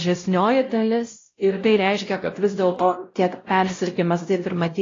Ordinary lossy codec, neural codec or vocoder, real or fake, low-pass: AAC, 32 kbps; codec, 16 kHz, 0.5 kbps, X-Codec, WavLM features, trained on Multilingual LibriSpeech; fake; 7.2 kHz